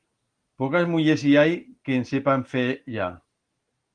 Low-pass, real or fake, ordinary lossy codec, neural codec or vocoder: 9.9 kHz; real; Opus, 24 kbps; none